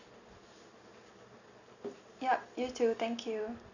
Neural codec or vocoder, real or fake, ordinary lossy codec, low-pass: none; real; none; 7.2 kHz